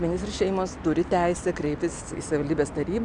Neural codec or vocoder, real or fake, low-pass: none; real; 9.9 kHz